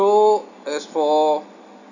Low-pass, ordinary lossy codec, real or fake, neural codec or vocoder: 7.2 kHz; none; real; none